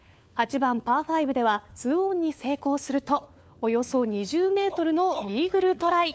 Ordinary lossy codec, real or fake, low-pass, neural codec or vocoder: none; fake; none; codec, 16 kHz, 4 kbps, FunCodec, trained on LibriTTS, 50 frames a second